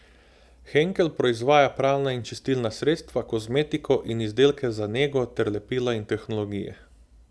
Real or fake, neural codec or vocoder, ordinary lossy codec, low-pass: real; none; none; none